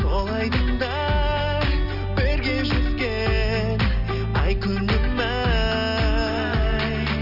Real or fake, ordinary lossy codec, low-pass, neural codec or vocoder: real; Opus, 32 kbps; 5.4 kHz; none